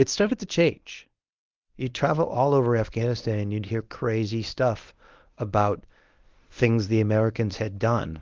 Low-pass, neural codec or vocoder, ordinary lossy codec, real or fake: 7.2 kHz; codec, 24 kHz, 0.9 kbps, WavTokenizer, medium speech release version 1; Opus, 32 kbps; fake